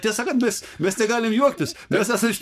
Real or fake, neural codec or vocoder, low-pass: fake; codec, 44.1 kHz, 7.8 kbps, Pupu-Codec; 14.4 kHz